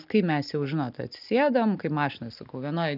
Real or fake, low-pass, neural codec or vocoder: real; 5.4 kHz; none